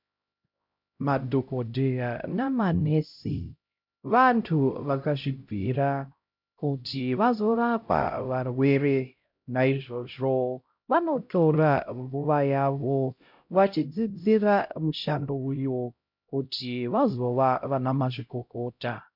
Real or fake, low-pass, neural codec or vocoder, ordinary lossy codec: fake; 5.4 kHz; codec, 16 kHz, 0.5 kbps, X-Codec, HuBERT features, trained on LibriSpeech; MP3, 48 kbps